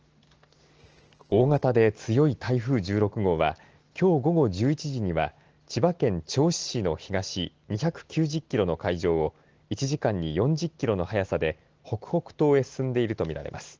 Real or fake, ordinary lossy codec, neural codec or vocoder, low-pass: real; Opus, 16 kbps; none; 7.2 kHz